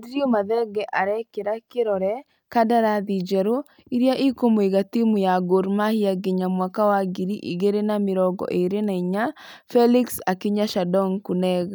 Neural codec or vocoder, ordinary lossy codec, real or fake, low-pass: none; none; real; none